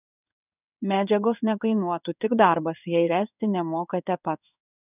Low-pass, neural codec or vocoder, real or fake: 3.6 kHz; codec, 16 kHz in and 24 kHz out, 1 kbps, XY-Tokenizer; fake